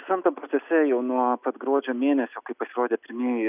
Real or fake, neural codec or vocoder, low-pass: real; none; 3.6 kHz